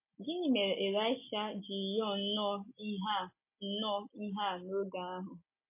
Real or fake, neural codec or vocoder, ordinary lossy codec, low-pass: real; none; MP3, 24 kbps; 3.6 kHz